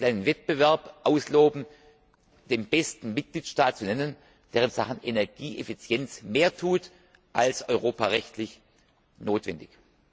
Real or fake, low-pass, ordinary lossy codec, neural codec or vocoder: real; none; none; none